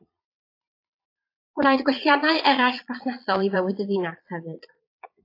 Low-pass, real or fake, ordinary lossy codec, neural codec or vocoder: 5.4 kHz; fake; AAC, 32 kbps; vocoder, 22.05 kHz, 80 mel bands, Vocos